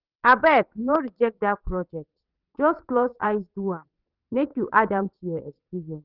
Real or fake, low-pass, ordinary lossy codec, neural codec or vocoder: fake; 5.4 kHz; none; vocoder, 22.05 kHz, 80 mel bands, WaveNeXt